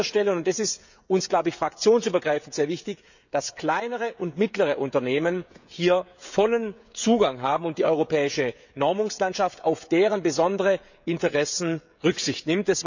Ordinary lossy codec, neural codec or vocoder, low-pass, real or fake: none; codec, 16 kHz, 16 kbps, FreqCodec, smaller model; 7.2 kHz; fake